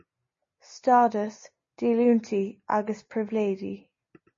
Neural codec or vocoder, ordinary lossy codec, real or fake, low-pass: none; MP3, 32 kbps; real; 7.2 kHz